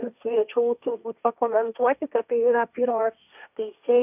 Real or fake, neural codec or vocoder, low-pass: fake; codec, 16 kHz, 1.1 kbps, Voila-Tokenizer; 3.6 kHz